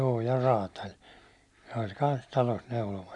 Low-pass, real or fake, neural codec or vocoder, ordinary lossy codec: 10.8 kHz; real; none; none